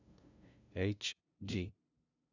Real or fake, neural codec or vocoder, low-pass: fake; codec, 16 kHz, 0.5 kbps, FunCodec, trained on LibriTTS, 25 frames a second; 7.2 kHz